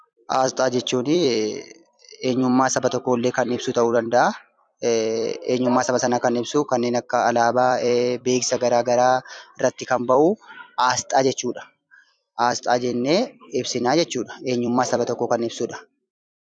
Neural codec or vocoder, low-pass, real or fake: vocoder, 44.1 kHz, 128 mel bands every 256 samples, BigVGAN v2; 9.9 kHz; fake